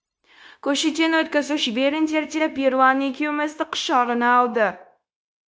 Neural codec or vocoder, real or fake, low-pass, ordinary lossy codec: codec, 16 kHz, 0.9 kbps, LongCat-Audio-Codec; fake; none; none